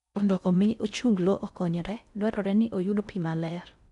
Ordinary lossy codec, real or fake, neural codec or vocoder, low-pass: none; fake; codec, 16 kHz in and 24 kHz out, 0.6 kbps, FocalCodec, streaming, 4096 codes; 10.8 kHz